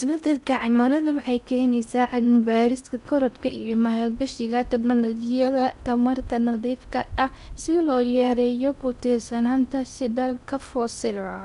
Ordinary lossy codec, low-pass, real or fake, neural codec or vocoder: none; 10.8 kHz; fake; codec, 16 kHz in and 24 kHz out, 0.6 kbps, FocalCodec, streaming, 4096 codes